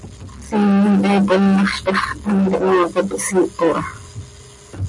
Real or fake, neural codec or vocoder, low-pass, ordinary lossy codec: real; none; 10.8 kHz; MP3, 48 kbps